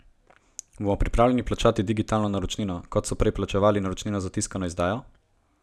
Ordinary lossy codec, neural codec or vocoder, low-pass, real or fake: none; none; none; real